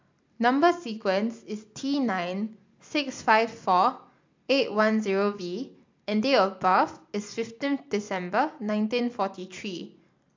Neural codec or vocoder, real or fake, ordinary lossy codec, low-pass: none; real; MP3, 64 kbps; 7.2 kHz